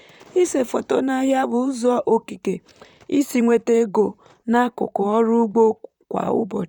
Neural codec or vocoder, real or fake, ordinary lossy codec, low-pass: vocoder, 44.1 kHz, 128 mel bands, Pupu-Vocoder; fake; none; 19.8 kHz